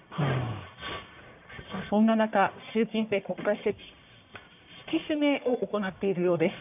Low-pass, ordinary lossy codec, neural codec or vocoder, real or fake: 3.6 kHz; none; codec, 44.1 kHz, 1.7 kbps, Pupu-Codec; fake